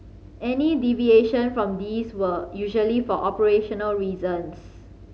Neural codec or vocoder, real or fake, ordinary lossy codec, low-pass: none; real; none; none